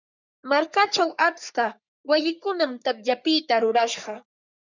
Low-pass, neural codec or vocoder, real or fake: 7.2 kHz; codec, 44.1 kHz, 3.4 kbps, Pupu-Codec; fake